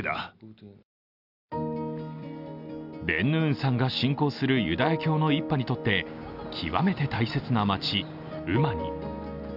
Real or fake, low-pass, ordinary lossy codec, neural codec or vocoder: real; 5.4 kHz; none; none